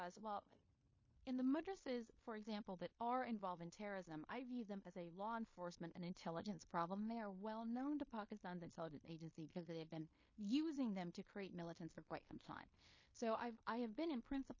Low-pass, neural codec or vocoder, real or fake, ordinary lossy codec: 7.2 kHz; codec, 16 kHz in and 24 kHz out, 0.9 kbps, LongCat-Audio-Codec, fine tuned four codebook decoder; fake; MP3, 32 kbps